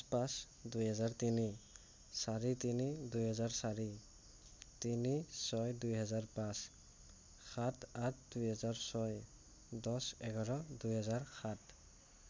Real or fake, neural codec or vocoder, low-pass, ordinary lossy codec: real; none; none; none